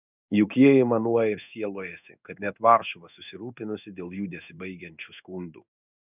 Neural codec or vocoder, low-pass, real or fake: codec, 16 kHz in and 24 kHz out, 1 kbps, XY-Tokenizer; 3.6 kHz; fake